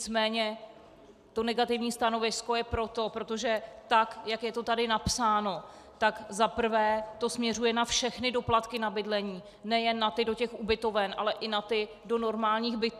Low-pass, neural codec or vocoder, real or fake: 14.4 kHz; none; real